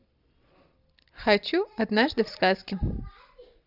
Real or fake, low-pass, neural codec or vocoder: real; 5.4 kHz; none